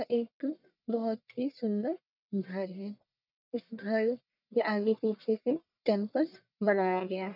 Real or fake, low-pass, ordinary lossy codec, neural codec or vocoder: fake; 5.4 kHz; none; codec, 44.1 kHz, 1.7 kbps, Pupu-Codec